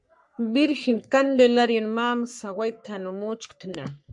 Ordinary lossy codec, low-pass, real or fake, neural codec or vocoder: MP3, 64 kbps; 10.8 kHz; fake; codec, 44.1 kHz, 3.4 kbps, Pupu-Codec